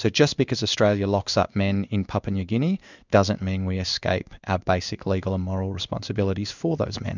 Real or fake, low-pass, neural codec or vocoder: fake; 7.2 kHz; codec, 16 kHz in and 24 kHz out, 1 kbps, XY-Tokenizer